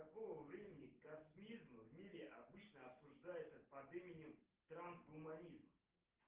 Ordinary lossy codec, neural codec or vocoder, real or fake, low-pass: Opus, 16 kbps; none; real; 3.6 kHz